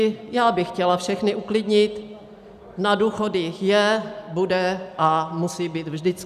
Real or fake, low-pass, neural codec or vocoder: real; 14.4 kHz; none